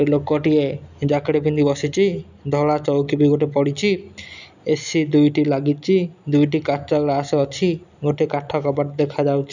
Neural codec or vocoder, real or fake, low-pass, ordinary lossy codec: none; real; 7.2 kHz; none